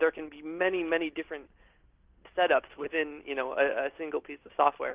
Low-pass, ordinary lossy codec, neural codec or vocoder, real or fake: 3.6 kHz; Opus, 24 kbps; none; real